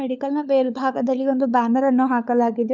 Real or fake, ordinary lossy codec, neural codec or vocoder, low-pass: fake; none; codec, 16 kHz, 4 kbps, FunCodec, trained on LibriTTS, 50 frames a second; none